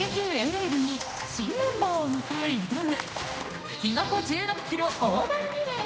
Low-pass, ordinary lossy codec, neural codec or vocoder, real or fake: none; none; codec, 16 kHz, 1 kbps, X-Codec, HuBERT features, trained on general audio; fake